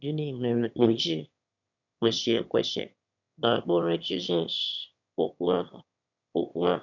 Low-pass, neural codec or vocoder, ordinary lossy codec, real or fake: 7.2 kHz; autoencoder, 22.05 kHz, a latent of 192 numbers a frame, VITS, trained on one speaker; none; fake